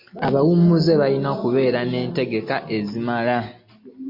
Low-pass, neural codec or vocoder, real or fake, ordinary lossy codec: 5.4 kHz; none; real; MP3, 32 kbps